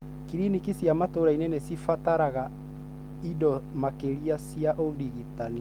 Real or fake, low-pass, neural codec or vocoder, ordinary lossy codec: real; 19.8 kHz; none; Opus, 24 kbps